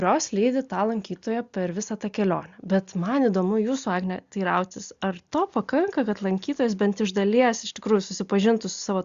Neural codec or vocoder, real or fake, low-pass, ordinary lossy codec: none; real; 7.2 kHz; Opus, 64 kbps